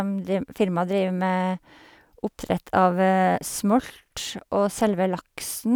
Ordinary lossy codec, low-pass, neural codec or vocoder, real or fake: none; none; none; real